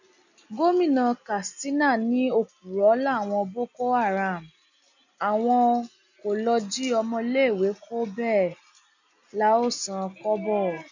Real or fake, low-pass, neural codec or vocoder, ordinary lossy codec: real; 7.2 kHz; none; none